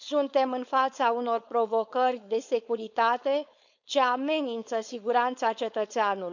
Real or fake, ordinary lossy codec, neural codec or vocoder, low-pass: fake; none; codec, 16 kHz, 4.8 kbps, FACodec; 7.2 kHz